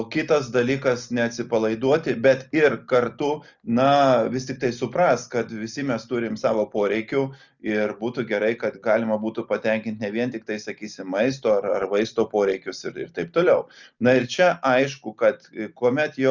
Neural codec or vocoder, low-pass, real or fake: none; 7.2 kHz; real